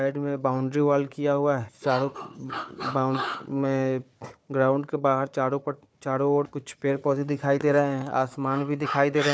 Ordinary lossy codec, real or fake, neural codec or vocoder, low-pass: none; fake; codec, 16 kHz, 4 kbps, FunCodec, trained on Chinese and English, 50 frames a second; none